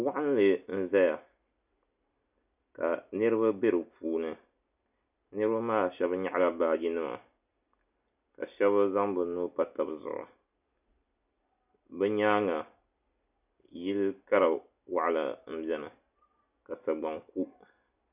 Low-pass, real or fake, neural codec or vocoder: 3.6 kHz; real; none